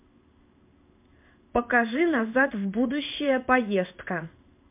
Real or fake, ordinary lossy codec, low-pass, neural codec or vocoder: fake; MP3, 32 kbps; 3.6 kHz; codec, 16 kHz in and 24 kHz out, 1 kbps, XY-Tokenizer